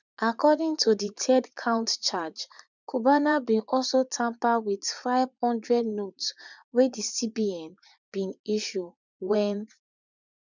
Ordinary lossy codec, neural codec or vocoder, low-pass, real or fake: none; vocoder, 44.1 kHz, 80 mel bands, Vocos; 7.2 kHz; fake